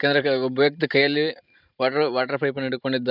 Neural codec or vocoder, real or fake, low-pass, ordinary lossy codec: vocoder, 44.1 kHz, 128 mel bands every 512 samples, BigVGAN v2; fake; 5.4 kHz; none